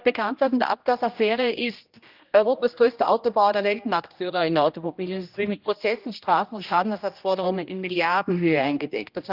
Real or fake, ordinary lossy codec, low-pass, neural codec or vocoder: fake; Opus, 24 kbps; 5.4 kHz; codec, 16 kHz, 1 kbps, X-Codec, HuBERT features, trained on general audio